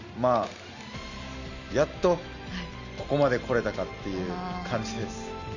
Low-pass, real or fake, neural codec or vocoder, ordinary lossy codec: 7.2 kHz; real; none; none